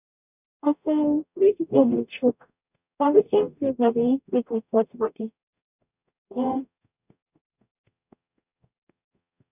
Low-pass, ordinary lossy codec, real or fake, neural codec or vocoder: 3.6 kHz; none; fake; codec, 44.1 kHz, 0.9 kbps, DAC